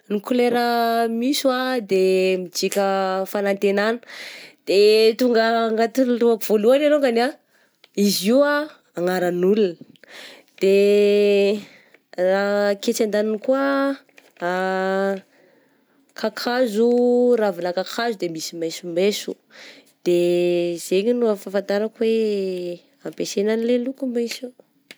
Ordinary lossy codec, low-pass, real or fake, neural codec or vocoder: none; none; real; none